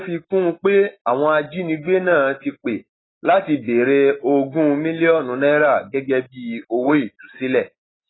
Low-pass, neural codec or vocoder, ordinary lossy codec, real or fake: 7.2 kHz; none; AAC, 16 kbps; real